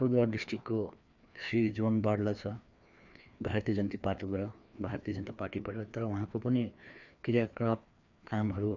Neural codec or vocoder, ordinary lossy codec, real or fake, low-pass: codec, 16 kHz, 2 kbps, FreqCodec, larger model; none; fake; 7.2 kHz